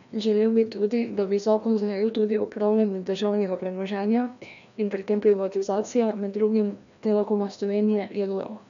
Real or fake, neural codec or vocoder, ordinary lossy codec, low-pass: fake; codec, 16 kHz, 1 kbps, FreqCodec, larger model; none; 7.2 kHz